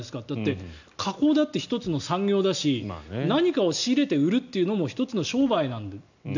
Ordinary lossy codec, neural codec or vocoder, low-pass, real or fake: none; none; 7.2 kHz; real